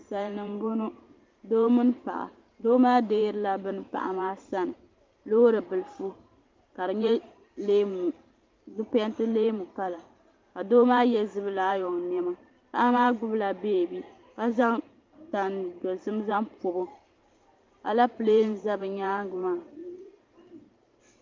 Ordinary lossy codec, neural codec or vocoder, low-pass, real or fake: Opus, 32 kbps; vocoder, 44.1 kHz, 128 mel bands every 512 samples, BigVGAN v2; 7.2 kHz; fake